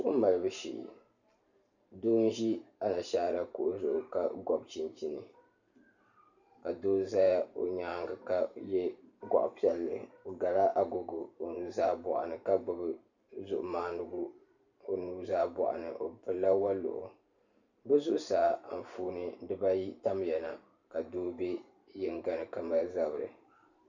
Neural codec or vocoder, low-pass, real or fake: vocoder, 44.1 kHz, 128 mel bands every 512 samples, BigVGAN v2; 7.2 kHz; fake